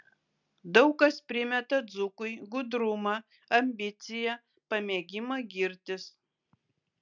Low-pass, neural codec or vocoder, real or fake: 7.2 kHz; none; real